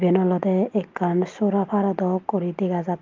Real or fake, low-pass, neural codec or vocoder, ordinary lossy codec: real; 7.2 kHz; none; Opus, 32 kbps